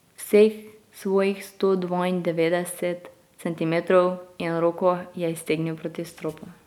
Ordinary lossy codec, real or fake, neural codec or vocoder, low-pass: none; real; none; 19.8 kHz